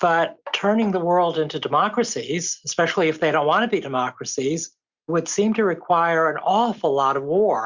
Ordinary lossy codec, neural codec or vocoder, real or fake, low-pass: Opus, 64 kbps; none; real; 7.2 kHz